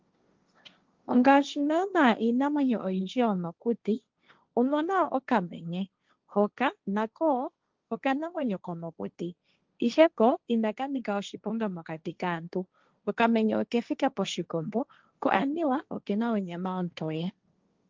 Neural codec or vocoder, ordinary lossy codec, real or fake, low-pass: codec, 16 kHz, 1.1 kbps, Voila-Tokenizer; Opus, 32 kbps; fake; 7.2 kHz